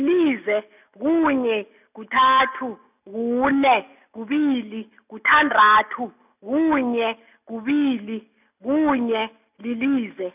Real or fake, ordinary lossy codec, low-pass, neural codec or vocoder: real; none; 3.6 kHz; none